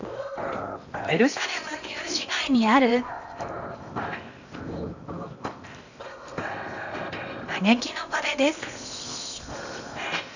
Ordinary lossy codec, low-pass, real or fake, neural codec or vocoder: none; 7.2 kHz; fake; codec, 16 kHz in and 24 kHz out, 0.8 kbps, FocalCodec, streaming, 65536 codes